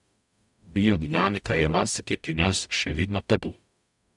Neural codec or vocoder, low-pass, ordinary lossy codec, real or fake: codec, 44.1 kHz, 0.9 kbps, DAC; 10.8 kHz; none; fake